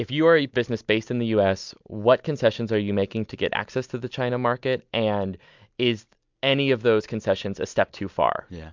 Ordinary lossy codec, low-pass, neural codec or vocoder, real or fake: MP3, 64 kbps; 7.2 kHz; none; real